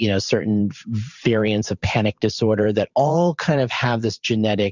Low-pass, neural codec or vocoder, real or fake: 7.2 kHz; vocoder, 44.1 kHz, 128 mel bands every 512 samples, BigVGAN v2; fake